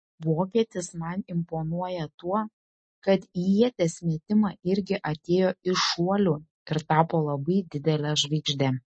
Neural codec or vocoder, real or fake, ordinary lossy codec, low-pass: none; real; MP3, 32 kbps; 9.9 kHz